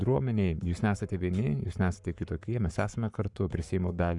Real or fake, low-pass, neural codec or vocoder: fake; 10.8 kHz; codec, 44.1 kHz, 7.8 kbps, Pupu-Codec